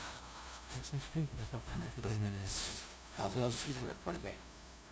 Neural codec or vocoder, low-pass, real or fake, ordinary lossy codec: codec, 16 kHz, 0.5 kbps, FunCodec, trained on LibriTTS, 25 frames a second; none; fake; none